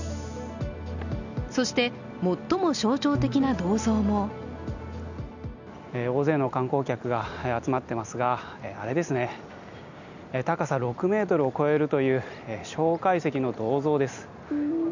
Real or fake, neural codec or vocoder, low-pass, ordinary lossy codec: real; none; 7.2 kHz; none